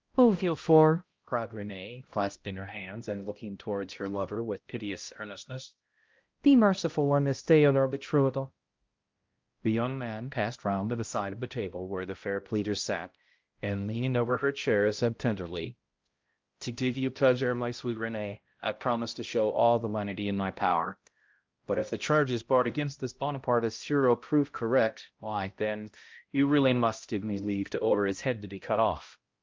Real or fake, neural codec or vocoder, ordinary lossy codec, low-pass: fake; codec, 16 kHz, 0.5 kbps, X-Codec, HuBERT features, trained on balanced general audio; Opus, 32 kbps; 7.2 kHz